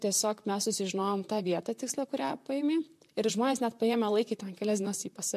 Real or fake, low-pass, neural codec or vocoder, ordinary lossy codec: fake; 14.4 kHz; vocoder, 44.1 kHz, 128 mel bands, Pupu-Vocoder; MP3, 64 kbps